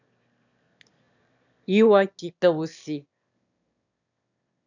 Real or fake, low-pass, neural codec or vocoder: fake; 7.2 kHz; autoencoder, 22.05 kHz, a latent of 192 numbers a frame, VITS, trained on one speaker